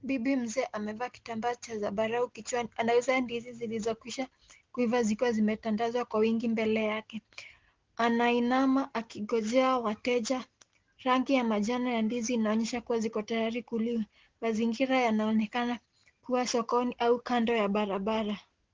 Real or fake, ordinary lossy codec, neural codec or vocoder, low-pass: real; Opus, 16 kbps; none; 7.2 kHz